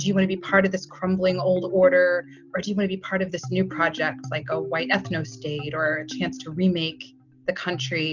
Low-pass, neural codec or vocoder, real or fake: 7.2 kHz; none; real